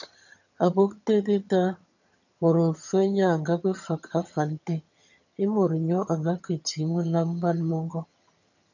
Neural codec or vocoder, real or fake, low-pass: vocoder, 22.05 kHz, 80 mel bands, HiFi-GAN; fake; 7.2 kHz